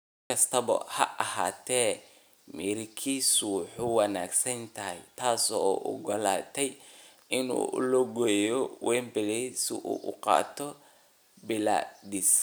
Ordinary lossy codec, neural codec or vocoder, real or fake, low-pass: none; none; real; none